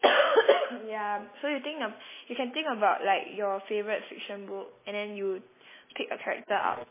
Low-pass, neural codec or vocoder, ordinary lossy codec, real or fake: 3.6 kHz; none; MP3, 16 kbps; real